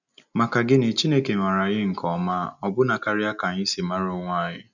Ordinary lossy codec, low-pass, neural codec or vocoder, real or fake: none; 7.2 kHz; none; real